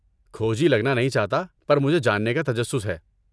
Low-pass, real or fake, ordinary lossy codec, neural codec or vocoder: none; real; none; none